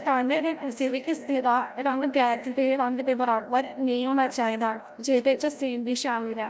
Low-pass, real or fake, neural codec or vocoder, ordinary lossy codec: none; fake; codec, 16 kHz, 0.5 kbps, FreqCodec, larger model; none